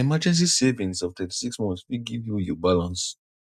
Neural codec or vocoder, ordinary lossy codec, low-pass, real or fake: none; none; 14.4 kHz; real